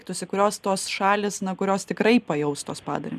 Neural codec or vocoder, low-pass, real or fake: none; 14.4 kHz; real